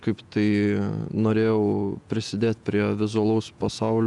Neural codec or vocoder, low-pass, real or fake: vocoder, 48 kHz, 128 mel bands, Vocos; 10.8 kHz; fake